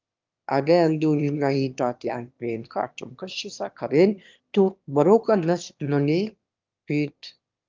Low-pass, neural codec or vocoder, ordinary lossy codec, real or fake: 7.2 kHz; autoencoder, 22.05 kHz, a latent of 192 numbers a frame, VITS, trained on one speaker; Opus, 24 kbps; fake